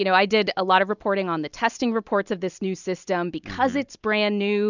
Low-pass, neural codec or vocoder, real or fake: 7.2 kHz; none; real